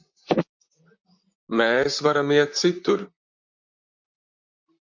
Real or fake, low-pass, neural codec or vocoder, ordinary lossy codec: fake; 7.2 kHz; vocoder, 44.1 kHz, 128 mel bands, Pupu-Vocoder; MP3, 64 kbps